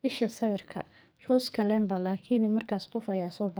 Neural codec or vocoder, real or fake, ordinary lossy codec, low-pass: codec, 44.1 kHz, 2.6 kbps, SNAC; fake; none; none